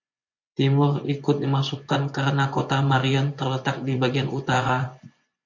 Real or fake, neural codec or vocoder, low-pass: fake; vocoder, 24 kHz, 100 mel bands, Vocos; 7.2 kHz